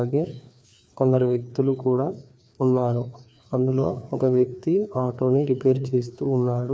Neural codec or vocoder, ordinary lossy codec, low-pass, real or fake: codec, 16 kHz, 2 kbps, FreqCodec, larger model; none; none; fake